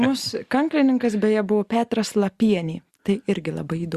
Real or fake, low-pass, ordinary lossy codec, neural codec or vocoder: real; 14.4 kHz; Opus, 64 kbps; none